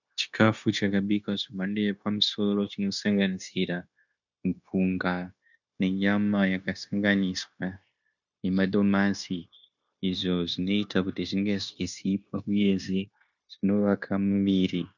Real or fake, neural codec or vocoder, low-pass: fake; codec, 16 kHz, 0.9 kbps, LongCat-Audio-Codec; 7.2 kHz